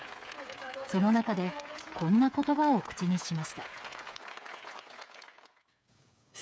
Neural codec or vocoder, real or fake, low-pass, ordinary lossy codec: codec, 16 kHz, 8 kbps, FreqCodec, smaller model; fake; none; none